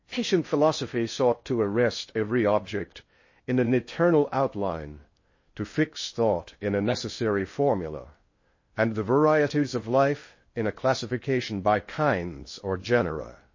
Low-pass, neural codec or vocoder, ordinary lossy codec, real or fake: 7.2 kHz; codec, 16 kHz in and 24 kHz out, 0.6 kbps, FocalCodec, streaming, 4096 codes; MP3, 32 kbps; fake